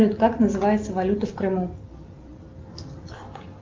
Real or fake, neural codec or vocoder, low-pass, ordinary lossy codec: real; none; 7.2 kHz; Opus, 32 kbps